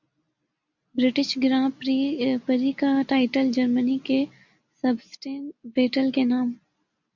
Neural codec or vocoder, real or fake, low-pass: none; real; 7.2 kHz